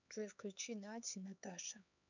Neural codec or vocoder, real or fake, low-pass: codec, 16 kHz, 4 kbps, X-Codec, HuBERT features, trained on LibriSpeech; fake; 7.2 kHz